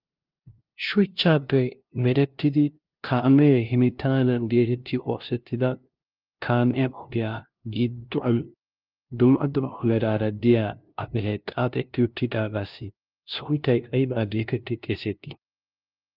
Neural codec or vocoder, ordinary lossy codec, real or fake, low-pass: codec, 16 kHz, 0.5 kbps, FunCodec, trained on LibriTTS, 25 frames a second; Opus, 32 kbps; fake; 5.4 kHz